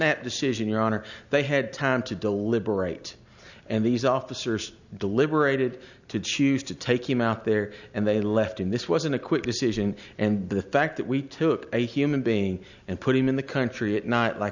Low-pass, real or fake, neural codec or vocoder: 7.2 kHz; real; none